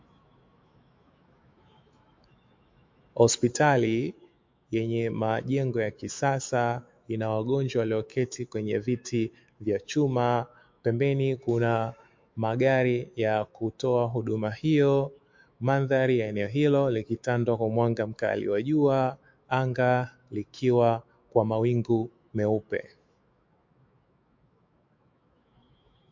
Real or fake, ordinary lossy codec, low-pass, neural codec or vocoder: fake; MP3, 48 kbps; 7.2 kHz; autoencoder, 48 kHz, 128 numbers a frame, DAC-VAE, trained on Japanese speech